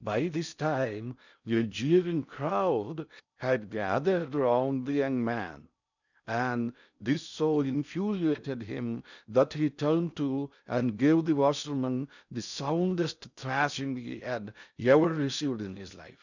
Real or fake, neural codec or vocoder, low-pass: fake; codec, 16 kHz in and 24 kHz out, 0.6 kbps, FocalCodec, streaming, 4096 codes; 7.2 kHz